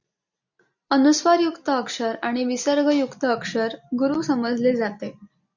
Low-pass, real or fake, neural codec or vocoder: 7.2 kHz; real; none